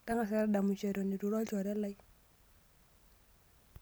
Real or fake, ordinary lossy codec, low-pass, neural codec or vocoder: real; none; none; none